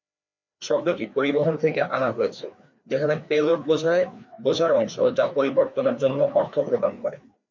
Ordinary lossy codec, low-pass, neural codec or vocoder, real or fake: MP3, 64 kbps; 7.2 kHz; codec, 16 kHz, 2 kbps, FreqCodec, larger model; fake